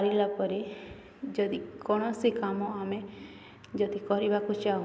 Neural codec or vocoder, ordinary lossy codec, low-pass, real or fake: none; none; none; real